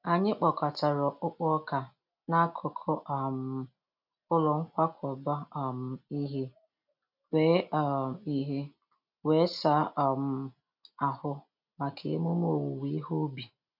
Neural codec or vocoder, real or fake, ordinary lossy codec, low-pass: none; real; none; 5.4 kHz